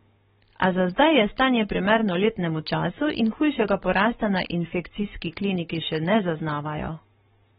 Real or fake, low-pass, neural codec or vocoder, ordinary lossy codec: fake; 19.8 kHz; codec, 44.1 kHz, 7.8 kbps, Pupu-Codec; AAC, 16 kbps